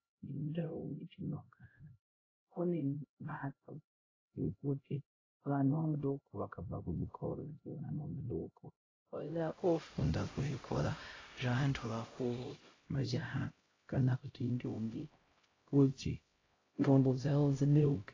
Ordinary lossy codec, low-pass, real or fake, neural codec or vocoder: AAC, 32 kbps; 7.2 kHz; fake; codec, 16 kHz, 0.5 kbps, X-Codec, HuBERT features, trained on LibriSpeech